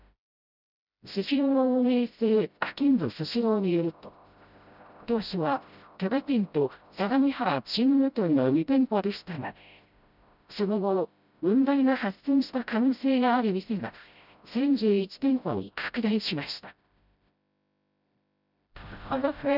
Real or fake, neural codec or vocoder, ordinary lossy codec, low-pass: fake; codec, 16 kHz, 0.5 kbps, FreqCodec, smaller model; none; 5.4 kHz